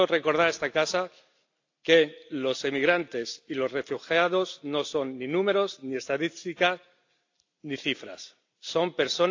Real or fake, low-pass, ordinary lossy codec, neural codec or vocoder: real; 7.2 kHz; AAC, 48 kbps; none